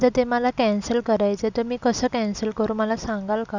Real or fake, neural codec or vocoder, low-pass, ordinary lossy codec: real; none; 7.2 kHz; none